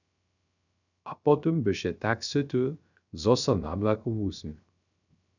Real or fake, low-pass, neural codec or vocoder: fake; 7.2 kHz; codec, 16 kHz, 0.3 kbps, FocalCodec